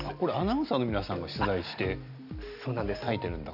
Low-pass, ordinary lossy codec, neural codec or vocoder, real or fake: 5.4 kHz; none; none; real